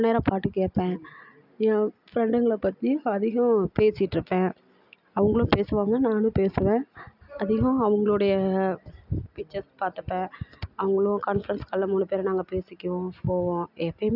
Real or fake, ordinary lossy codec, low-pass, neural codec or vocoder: real; none; 5.4 kHz; none